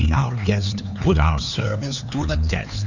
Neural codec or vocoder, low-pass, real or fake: codec, 16 kHz, 4 kbps, X-Codec, HuBERT features, trained on LibriSpeech; 7.2 kHz; fake